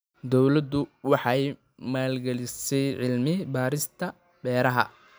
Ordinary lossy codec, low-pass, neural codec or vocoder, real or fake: none; none; none; real